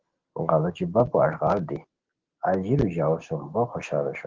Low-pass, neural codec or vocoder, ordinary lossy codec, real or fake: 7.2 kHz; none; Opus, 16 kbps; real